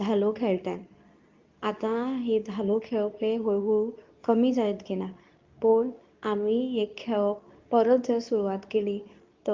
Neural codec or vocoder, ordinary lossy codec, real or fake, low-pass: codec, 24 kHz, 0.9 kbps, WavTokenizer, medium speech release version 2; Opus, 24 kbps; fake; 7.2 kHz